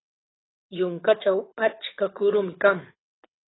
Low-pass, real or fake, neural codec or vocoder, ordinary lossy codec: 7.2 kHz; fake; codec, 24 kHz, 6 kbps, HILCodec; AAC, 16 kbps